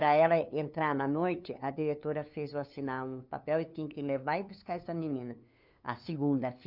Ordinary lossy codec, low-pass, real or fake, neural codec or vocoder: none; 5.4 kHz; fake; codec, 16 kHz, 2 kbps, FunCodec, trained on LibriTTS, 25 frames a second